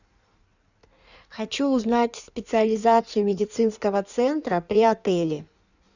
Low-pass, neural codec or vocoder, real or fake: 7.2 kHz; codec, 16 kHz in and 24 kHz out, 1.1 kbps, FireRedTTS-2 codec; fake